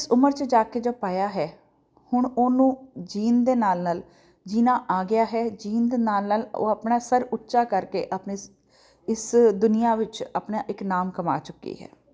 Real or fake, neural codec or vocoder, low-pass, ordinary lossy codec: real; none; none; none